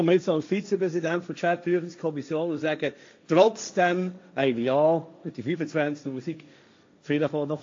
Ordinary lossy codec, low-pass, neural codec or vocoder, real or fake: AAC, 48 kbps; 7.2 kHz; codec, 16 kHz, 1.1 kbps, Voila-Tokenizer; fake